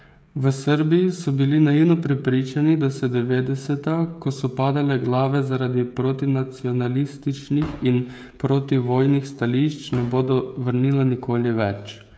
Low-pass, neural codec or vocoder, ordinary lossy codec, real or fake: none; codec, 16 kHz, 16 kbps, FreqCodec, smaller model; none; fake